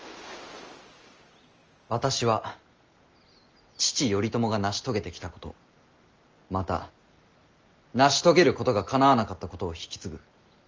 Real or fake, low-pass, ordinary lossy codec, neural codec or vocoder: real; 7.2 kHz; Opus, 24 kbps; none